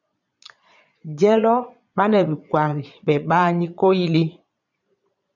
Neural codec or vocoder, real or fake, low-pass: vocoder, 22.05 kHz, 80 mel bands, Vocos; fake; 7.2 kHz